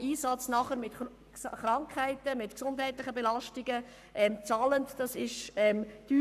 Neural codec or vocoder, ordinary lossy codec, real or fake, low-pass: codec, 44.1 kHz, 7.8 kbps, Pupu-Codec; none; fake; 14.4 kHz